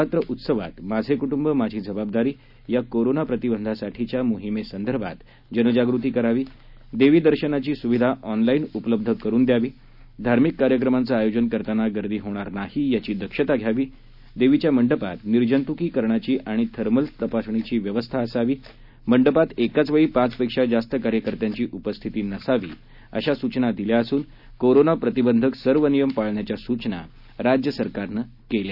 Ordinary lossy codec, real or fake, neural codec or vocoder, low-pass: none; real; none; 5.4 kHz